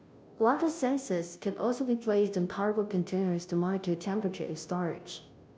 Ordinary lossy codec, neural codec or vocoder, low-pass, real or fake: none; codec, 16 kHz, 0.5 kbps, FunCodec, trained on Chinese and English, 25 frames a second; none; fake